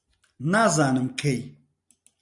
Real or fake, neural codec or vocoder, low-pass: real; none; 10.8 kHz